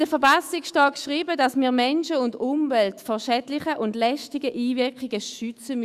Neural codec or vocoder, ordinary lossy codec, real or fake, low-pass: autoencoder, 48 kHz, 128 numbers a frame, DAC-VAE, trained on Japanese speech; Opus, 64 kbps; fake; 14.4 kHz